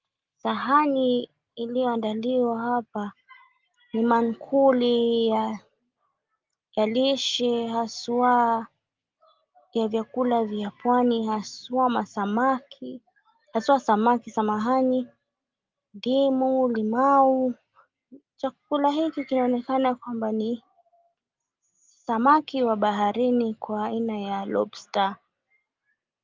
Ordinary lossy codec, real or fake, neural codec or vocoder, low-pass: Opus, 32 kbps; real; none; 7.2 kHz